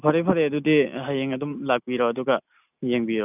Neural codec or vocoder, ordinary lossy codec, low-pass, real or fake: none; none; 3.6 kHz; real